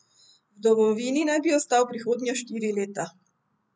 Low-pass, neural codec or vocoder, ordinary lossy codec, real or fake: none; none; none; real